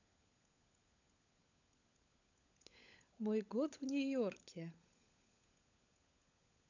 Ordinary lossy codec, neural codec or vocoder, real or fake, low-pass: none; codec, 16 kHz, 16 kbps, FunCodec, trained on LibriTTS, 50 frames a second; fake; 7.2 kHz